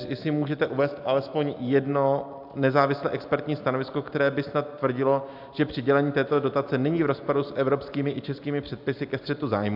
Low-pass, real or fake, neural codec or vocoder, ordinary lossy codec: 5.4 kHz; real; none; AAC, 48 kbps